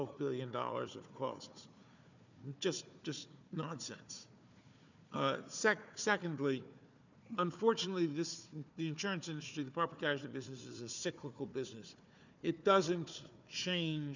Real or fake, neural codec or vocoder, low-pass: fake; codec, 16 kHz, 4 kbps, FunCodec, trained on Chinese and English, 50 frames a second; 7.2 kHz